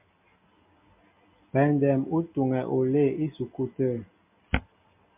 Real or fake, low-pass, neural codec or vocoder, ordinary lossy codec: real; 3.6 kHz; none; MP3, 32 kbps